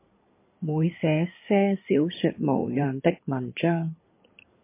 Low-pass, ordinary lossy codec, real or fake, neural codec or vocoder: 3.6 kHz; MP3, 24 kbps; fake; codec, 16 kHz in and 24 kHz out, 2.2 kbps, FireRedTTS-2 codec